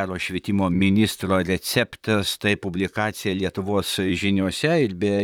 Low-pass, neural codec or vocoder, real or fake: 19.8 kHz; vocoder, 44.1 kHz, 128 mel bands, Pupu-Vocoder; fake